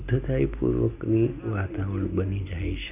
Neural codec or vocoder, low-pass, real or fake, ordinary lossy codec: none; 3.6 kHz; real; none